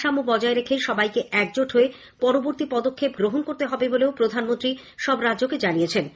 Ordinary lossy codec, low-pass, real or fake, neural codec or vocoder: none; none; real; none